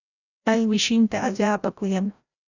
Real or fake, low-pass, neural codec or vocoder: fake; 7.2 kHz; codec, 16 kHz, 0.5 kbps, FreqCodec, larger model